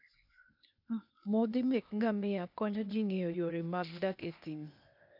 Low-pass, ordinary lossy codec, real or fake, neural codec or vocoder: 5.4 kHz; none; fake; codec, 16 kHz, 0.8 kbps, ZipCodec